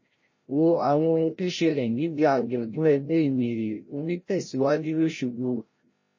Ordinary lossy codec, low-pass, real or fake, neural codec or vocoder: MP3, 32 kbps; 7.2 kHz; fake; codec, 16 kHz, 0.5 kbps, FreqCodec, larger model